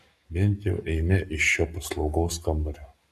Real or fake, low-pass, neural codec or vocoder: fake; 14.4 kHz; codec, 44.1 kHz, 7.8 kbps, Pupu-Codec